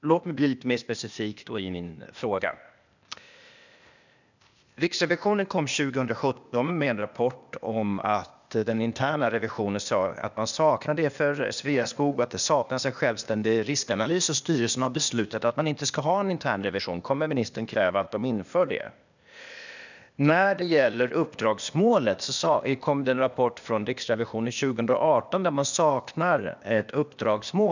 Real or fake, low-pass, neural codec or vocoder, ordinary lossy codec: fake; 7.2 kHz; codec, 16 kHz, 0.8 kbps, ZipCodec; none